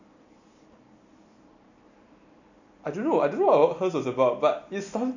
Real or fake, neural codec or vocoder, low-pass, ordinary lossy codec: real; none; 7.2 kHz; none